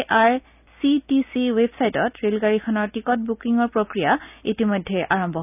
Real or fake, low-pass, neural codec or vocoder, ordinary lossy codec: real; 3.6 kHz; none; none